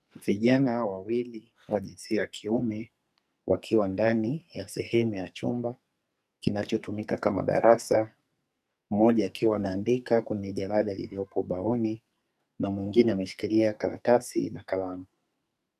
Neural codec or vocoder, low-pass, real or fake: codec, 44.1 kHz, 2.6 kbps, SNAC; 14.4 kHz; fake